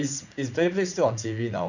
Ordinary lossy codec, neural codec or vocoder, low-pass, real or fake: none; vocoder, 44.1 kHz, 80 mel bands, Vocos; 7.2 kHz; fake